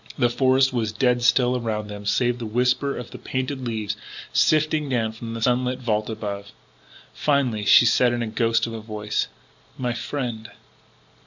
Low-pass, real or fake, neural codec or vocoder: 7.2 kHz; real; none